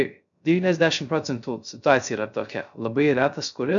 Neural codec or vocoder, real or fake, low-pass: codec, 16 kHz, 0.3 kbps, FocalCodec; fake; 7.2 kHz